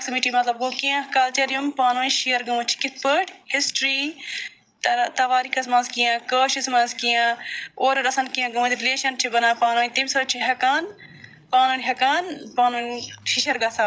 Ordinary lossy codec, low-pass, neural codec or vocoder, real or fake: none; none; codec, 16 kHz, 16 kbps, FreqCodec, larger model; fake